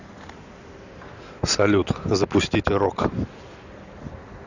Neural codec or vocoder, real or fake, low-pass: none; real; 7.2 kHz